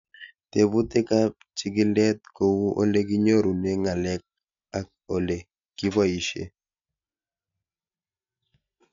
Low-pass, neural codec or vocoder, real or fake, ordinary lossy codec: 7.2 kHz; none; real; MP3, 64 kbps